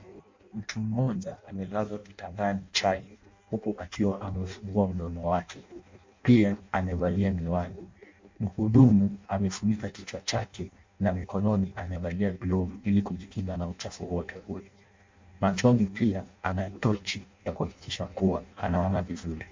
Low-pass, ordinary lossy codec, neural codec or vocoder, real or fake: 7.2 kHz; MP3, 48 kbps; codec, 16 kHz in and 24 kHz out, 0.6 kbps, FireRedTTS-2 codec; fake